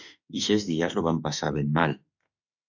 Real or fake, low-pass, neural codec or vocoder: fake; 7.2 kHz; autoencoder, 48 kHz, 32 numbers a frame, DAC-VAE, trained on Japanese speech